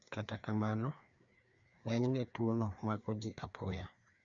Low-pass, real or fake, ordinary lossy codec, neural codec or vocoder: 7.2 kHz; fake; none; codec, 16 kHz, 2 kbps, FreqCodec, larger model